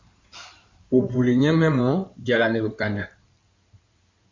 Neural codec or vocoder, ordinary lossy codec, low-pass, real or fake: codec, 16 kHz in and 24 kHz out, 2.2 kbps, FireRedTTS-2 codec; MP3, 48 kbps; 7.2 kHz; fake